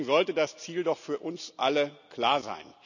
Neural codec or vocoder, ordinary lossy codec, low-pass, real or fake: none; none; 7.2 kHz; real